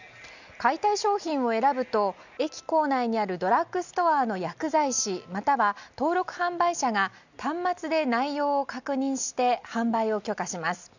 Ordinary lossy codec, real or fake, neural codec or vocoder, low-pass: none; real; none; 7.2 kHz